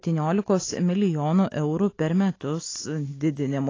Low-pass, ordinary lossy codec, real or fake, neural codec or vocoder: 7.2 kHz; AAC, 32 kbps; real; none